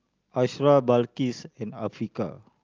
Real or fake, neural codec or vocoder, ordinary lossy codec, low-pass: real; none; Opus, 24 kbps; 7.2 kHz